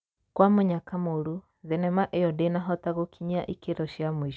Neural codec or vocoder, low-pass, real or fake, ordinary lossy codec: none; none; real; none